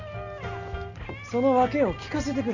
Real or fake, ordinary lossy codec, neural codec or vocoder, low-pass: real; Opus, 64 kbps; none; 7.2 kHz